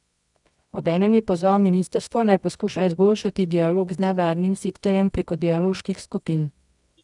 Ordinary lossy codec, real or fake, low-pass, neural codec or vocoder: none; fake; 10.8 kHz; codec, 24 kHz, 0.9 kbps, WavTokenizer, medium music audio release